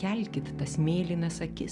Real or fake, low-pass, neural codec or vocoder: real; 10.8 kHz; none